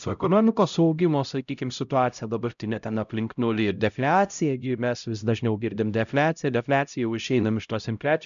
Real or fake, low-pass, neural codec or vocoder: fake; 7.2 kHz; codec, 16 kHz, 0.5 kbps, X-Codec, HuBERT features, trained on LibriSpeech